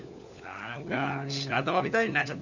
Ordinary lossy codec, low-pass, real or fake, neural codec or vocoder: none; 7.2 kHz; fake; codec, 16 kHz, 4 kbps, FunCodec, trained on LibriTTS, 50 frames a second